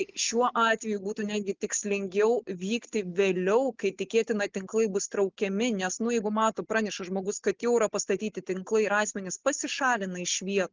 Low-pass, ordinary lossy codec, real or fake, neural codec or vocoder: 7.2 kHz; Opus, 24 kbps; real; none